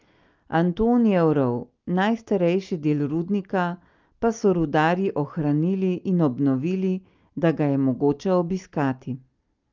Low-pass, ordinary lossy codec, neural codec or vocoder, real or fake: 7.2 kHz; Opus, 24 kbps; none; real